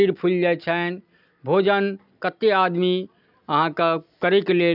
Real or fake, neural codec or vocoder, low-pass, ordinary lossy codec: real; none; 5.4 kHz; none